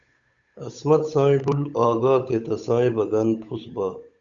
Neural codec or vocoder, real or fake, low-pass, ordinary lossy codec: codec, 16 kHz, 8 kbps, FunCodec, trained on Chinese and English, 25 frames a second; fake; 7.2 kHz; Opus, 64 kbps